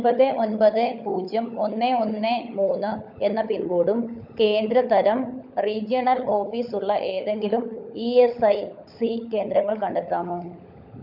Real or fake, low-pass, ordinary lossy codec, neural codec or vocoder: fake; 5.4 kHz; none; codec, 16 kHz, 16 kbps, FunCodec, trained on LibriTTS, 50 frames a second